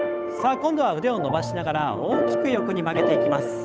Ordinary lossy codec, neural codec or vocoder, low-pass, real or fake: none; codec, 16 kHz, 8 kbps, FunCodec, trained on Chinese and English, 25 frames a second; none; fake